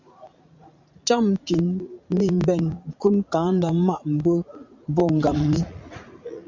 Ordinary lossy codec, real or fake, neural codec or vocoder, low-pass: MP3, 64 kbps; fake; vocoder, 44.1 kHz, 80 mel bands, Vocos; 7.2 kHz